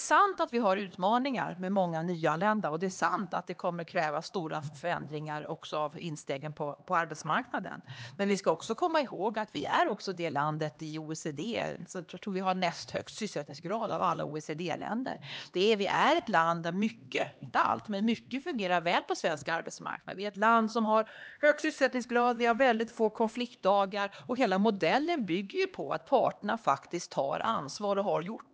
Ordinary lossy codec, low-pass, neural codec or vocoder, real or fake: none; none; codec, 16 kHz, 2 kbps, X-Codec, HuBERT features, trained on LibriSpeech; fake